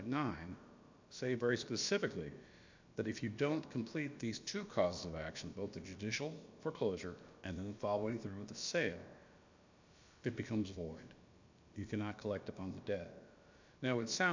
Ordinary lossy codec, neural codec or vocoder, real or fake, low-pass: MP3, 64 kbps; codec, 16 kHz, about 1 kbps, DyCAST, with the encoder's durations; fake; 7.2 kHz